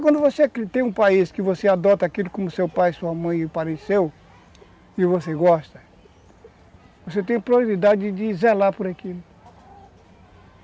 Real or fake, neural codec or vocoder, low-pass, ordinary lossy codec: real; none; none; none